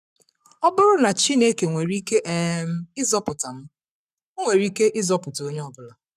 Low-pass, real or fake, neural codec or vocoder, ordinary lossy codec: 14.4 kHz; fake; codec, 44.1 kHz, 7.8 kbps, Pupu-Codec; none